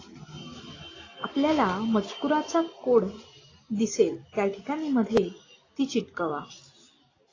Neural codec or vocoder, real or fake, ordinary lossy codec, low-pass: none; real; AAC, 32 kbps; 7.2 kHz